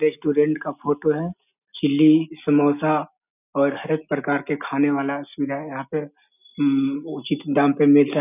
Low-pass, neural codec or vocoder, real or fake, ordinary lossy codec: 3.6 kHz; none; real; none